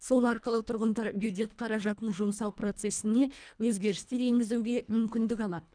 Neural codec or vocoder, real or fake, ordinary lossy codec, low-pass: codec, 24 kHz, 1.5 kbps, HILCodec; fake; none; 9.9 kHz